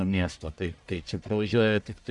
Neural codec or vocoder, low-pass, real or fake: codec, 44.1 kHz, 1.7 kbps, Pupu-Codec; 10.8 kHz; fake